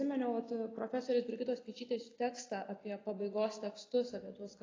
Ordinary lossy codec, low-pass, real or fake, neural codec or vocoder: AAC, 32 kbps; 7.2 kHz; real; none